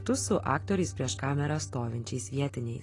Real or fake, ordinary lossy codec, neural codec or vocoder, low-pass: real; AAC, 32 kbps; none; 10.8 kHz